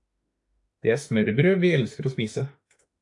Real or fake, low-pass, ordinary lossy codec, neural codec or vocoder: fake; 10.8 kHz; AAC, 64 kbps; autoencoder, 48 kHz, 32 numbers a frame, DAC-VAE, trained on Japanese speech